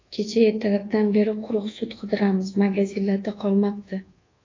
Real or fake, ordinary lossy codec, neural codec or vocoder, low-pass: fake; AAC, 32 kbps; codec, 24 kHz, 1.2 kbps, DualCodec; 7.2 kHz